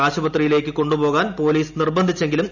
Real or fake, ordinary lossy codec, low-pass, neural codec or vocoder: real; none; 7.2 kHz; none